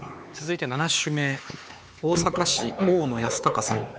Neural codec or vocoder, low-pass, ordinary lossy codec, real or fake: codec, 16 kHz, 4 kbps, X-Codec, HuBERT features, trained on LibriSpeech; none; none; fake